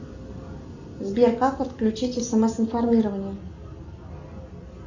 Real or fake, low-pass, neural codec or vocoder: real; 7.2 kHz; none